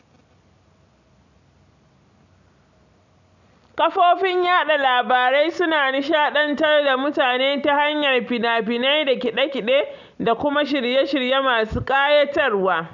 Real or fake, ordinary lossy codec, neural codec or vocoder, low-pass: real; none; none; 7.2 kHz